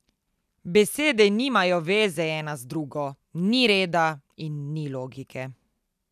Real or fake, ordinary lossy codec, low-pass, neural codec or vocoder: real; none; 14.4 kHz; none